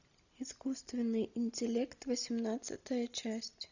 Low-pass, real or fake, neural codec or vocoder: 7.2 kHz; real; none